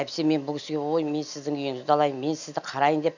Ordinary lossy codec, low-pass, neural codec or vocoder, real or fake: none; 7.2 kHz; none; real